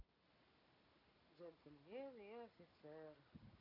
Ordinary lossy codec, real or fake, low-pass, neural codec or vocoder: none; real; 5.4 kHz; none